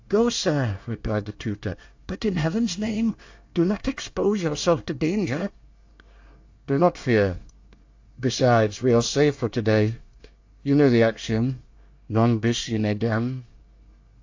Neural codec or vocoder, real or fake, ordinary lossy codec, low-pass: codec, 24 kHz, 1 kbps, SNAC; fake; AAC, 48 kbps; 7.2 kHz